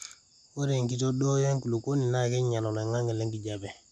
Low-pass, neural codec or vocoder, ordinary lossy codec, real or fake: none; none; none; real